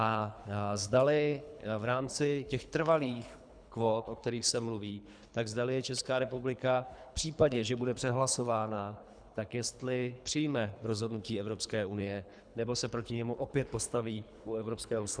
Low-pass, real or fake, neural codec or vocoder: 9.9 kHz; fake; codec, 24 kHz, 3 kbps, HILCodec